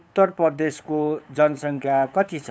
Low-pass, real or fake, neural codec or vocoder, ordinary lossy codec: none; fake; codec, 16 kHz, 8 kbps, FunCodec, trained on LibriTTS, 25 frames a second; none